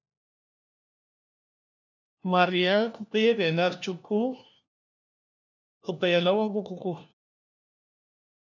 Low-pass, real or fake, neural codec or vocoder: 7.2 kHz; fake; codec, 16 kHz, 1 kbps, FunCodec, trained on LibriTTS, 50 frames a second